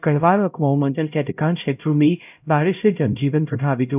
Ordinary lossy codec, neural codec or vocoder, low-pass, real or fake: none; codec, 16 kHz, 0.5 kbps, X-Codec, HuBERT features, trained on LibriSpeech; 3.6 kHz; fake